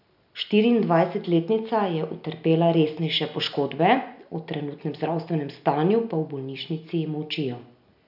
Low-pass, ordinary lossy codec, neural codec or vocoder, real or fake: 5.4 kHz; none; none; real